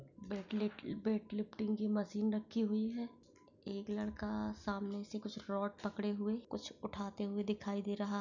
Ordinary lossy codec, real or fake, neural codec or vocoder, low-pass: none; real; none; 7.2 kHz